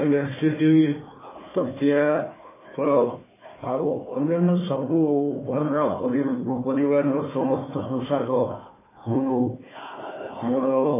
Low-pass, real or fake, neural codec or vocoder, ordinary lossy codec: 3.6 kHz; fake; codec, 16 kHz, 1 kbps, FunCodec, trained on Chinese and English, 50 frames a second; MP3, 16 kbps